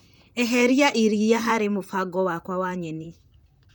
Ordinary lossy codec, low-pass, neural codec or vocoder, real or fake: none; none; vocoder, 44.1 kHz, 128 mel bands, Pupu-Vocoder; fake